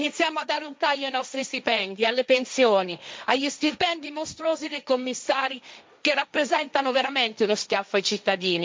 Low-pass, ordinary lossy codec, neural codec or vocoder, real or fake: none; none; codec, 16 kHz, 1.1 kbps, Voila-Tokenizer; fake